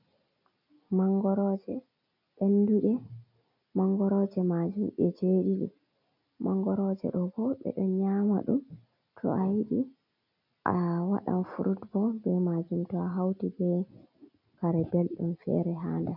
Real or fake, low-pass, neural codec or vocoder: real; 5.4 kHz; none